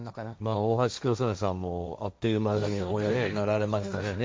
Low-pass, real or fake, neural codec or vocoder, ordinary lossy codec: none; fake; codec, 16 kHz, 1.1 kbps, Voila-Tokenizer; none